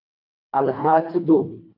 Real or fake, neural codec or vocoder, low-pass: fake; codec, 24 kHz, 1.5 kbps, HILCodec; 5.4 kHz